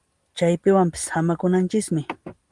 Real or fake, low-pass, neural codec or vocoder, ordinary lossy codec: real; 10.8 kHz; none; Opus, 24 kbps